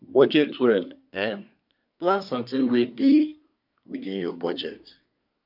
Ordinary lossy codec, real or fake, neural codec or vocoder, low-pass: none; fake; codec, 24 kHz, 1 kbps, SNAC; 5.4 kHz